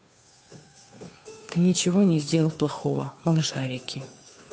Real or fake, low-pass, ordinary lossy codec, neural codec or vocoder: fake; none; none; codec, 16 kHz, 2 kbps, FunCodec, trained on Chinese and English, 25 frames a second